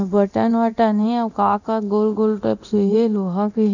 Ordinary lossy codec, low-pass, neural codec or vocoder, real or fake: none; 7.2 kHz; codec, 24 kHz, 0.9 kbps, DualCodec; fake